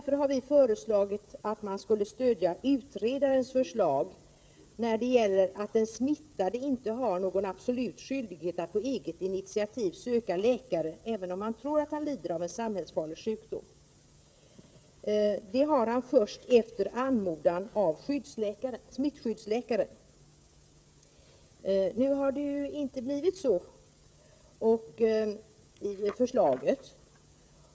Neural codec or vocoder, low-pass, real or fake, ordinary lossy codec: codec, 16 kHz, 16 kbps, FreqCodec, smaller model; none; fake; none